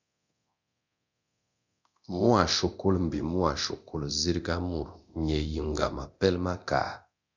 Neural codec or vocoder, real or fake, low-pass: codec, 24 kHz, 0.9 kbps, DualCodec; fake; 7.2 kHz